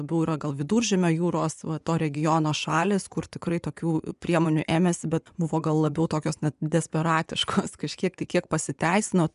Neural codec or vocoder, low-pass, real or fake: vocoder, 24 kHz, 100 mel bands, Vocos; 10.8 kHz; fake